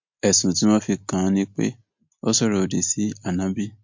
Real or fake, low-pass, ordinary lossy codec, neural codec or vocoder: real; 7.2 kHz; MP3, 48 kbps; none